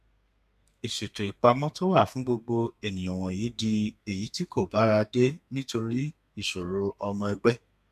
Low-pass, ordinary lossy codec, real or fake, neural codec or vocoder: 14.4 kHz; MP3, 96 kbps; fake; codec, 32 kHz, 1.9 kbps, SNAC